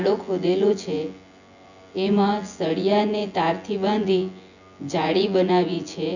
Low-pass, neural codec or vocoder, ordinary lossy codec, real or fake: 7.2 kHz; vocoder, 24 kHz, 100 mel bands, Vocos; none; fake